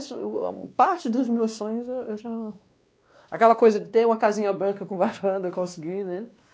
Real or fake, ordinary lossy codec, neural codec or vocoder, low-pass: fake; none; codec, 16 kHz, 2 kbps, X-Codec, WavLM features, trained on Multilingual LibriSpeech; none